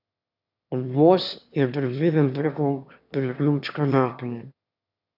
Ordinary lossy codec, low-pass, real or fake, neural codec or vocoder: none; 5.4 kHz; fake; autoencoder, 22.05 kHz, a latent of 192 numbers a frame, VITS, trained on one speaker